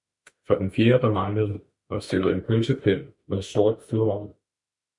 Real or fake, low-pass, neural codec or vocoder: fake; 10.8 kHz; autoencoder, 48 kHz, 32 numbers a frame, DAC-VAE, trained on Japanese speech